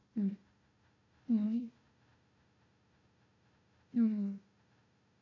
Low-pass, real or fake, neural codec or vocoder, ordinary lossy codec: 7.2 kHz; fake; codec, 16 kHz, 1 kbps, FunCodec, trained on Chinese and English, 50 frames a second; none